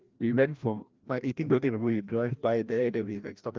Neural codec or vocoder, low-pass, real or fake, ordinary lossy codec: codec, 16 kHz, 1 kbps, FreqCodec, larger model; 7.2 kHz; fake; Opus, 32 kbps